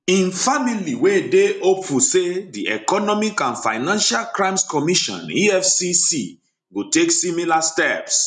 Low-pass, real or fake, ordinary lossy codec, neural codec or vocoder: 10.8 kHz; real; none; none